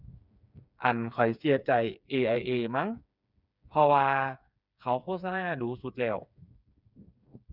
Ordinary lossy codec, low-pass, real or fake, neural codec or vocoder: none; 5.4 kHz; fake; codec, 16 kHz, 4 kbps, FreqCodec, smaller model